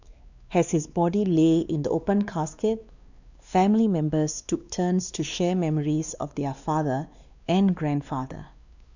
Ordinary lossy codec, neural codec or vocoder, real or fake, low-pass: none; codec, 16 kHz, 2 kbps, X-Codec, WavLM features, trained on Multilingual LibriSpeech; fake; 7.2 kHz